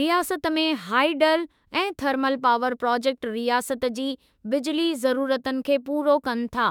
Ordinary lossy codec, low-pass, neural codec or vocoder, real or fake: none; 19.8 kHz; autoencoder, 48 kHz, 32 numbers a frame, DAC-VAE, trained on Japanese speech; fake